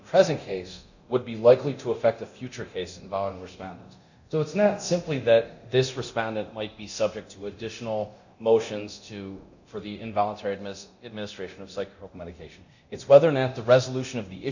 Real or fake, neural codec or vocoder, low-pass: fake; codec, 24 kHz, 0.9 kbps, DualCodec; 7.2 kHz